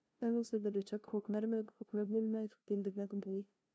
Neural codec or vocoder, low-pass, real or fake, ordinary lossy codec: codec, 16 kHz, 0.5 kbps, FunCodec, trained on LibriTTS, 25 frames a second; none; fake; none